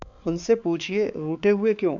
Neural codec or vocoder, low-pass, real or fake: codec, 16 kHz, 4 kbps, X-Codec, HuBERT features, trained on balanced general audio; 7.2 kHz; fake